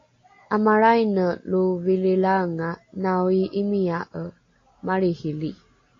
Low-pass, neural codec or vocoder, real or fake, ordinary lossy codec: 7.2 kHz; none; real; AAC, 32 kbps